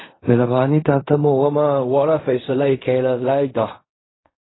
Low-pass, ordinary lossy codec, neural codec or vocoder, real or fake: 7.2 kHz; AAC, 16 kbps; codec, 16 kHz in and 24 kHz out, 0.4 kbps, LongCat-Audio-Codec, fine tuned four codebook decoder; fake